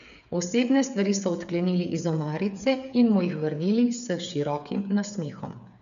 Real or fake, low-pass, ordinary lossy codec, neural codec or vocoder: fake; 7.2 kHz; none; codec, 16 kHz, 8 kbps, FreqCodec, smaller model